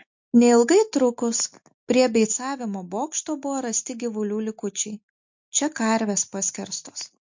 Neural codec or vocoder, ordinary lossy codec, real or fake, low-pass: none; MP3, 48 kbps; real; 7.2 kHz